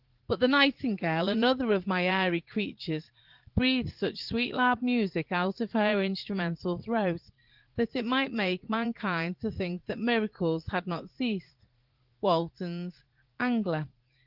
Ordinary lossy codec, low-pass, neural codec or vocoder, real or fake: Opus, 16 kbps; 5.4 kHz; vocoder, 44.1 kHz, 80 mel bands, Vocos; fake